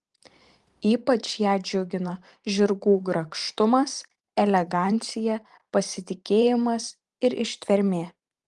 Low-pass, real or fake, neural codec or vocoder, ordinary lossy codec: 10.8 kHz; fake; vocoder, 44.1 kHz, 128 mel bands every 512 samples, BigVGAN v2; Opus, 24 kbps